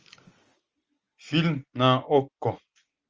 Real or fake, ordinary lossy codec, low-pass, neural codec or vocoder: real; Opus, 24 kbps; 7.2 kHz; none